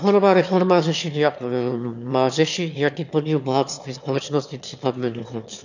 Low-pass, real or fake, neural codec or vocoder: 7.2 kHz; fake; autoencoder, 22.05 kHz, a latent of 192 numbers a frame, VITS, trained on one speaker